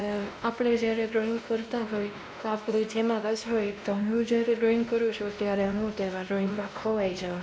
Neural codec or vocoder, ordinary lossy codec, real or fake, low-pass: codec, 16 kHz, 1 kbps, X-Codec, WavLM features, trained on Multilingual LibriSpeech; none; fake; none